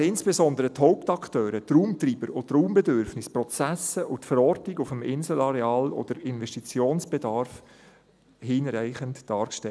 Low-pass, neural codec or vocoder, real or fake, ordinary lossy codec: none; none; real; none